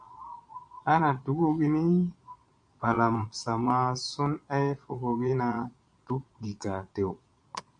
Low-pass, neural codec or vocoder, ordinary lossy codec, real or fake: 9.9 kHz; vocoder, 22.05 kHz, 80 mel bands, Vocos; MP3, 64 kbps; fake